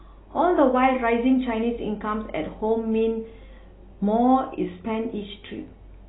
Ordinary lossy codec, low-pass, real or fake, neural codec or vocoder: AAC, 16 kbps; 7.2 kHz; real; none